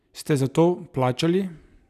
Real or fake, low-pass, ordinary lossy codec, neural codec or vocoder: real; 14.4 kHz; none; none